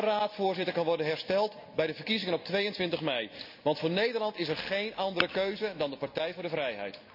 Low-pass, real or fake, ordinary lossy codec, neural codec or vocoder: 5.4 kHz; real; none; none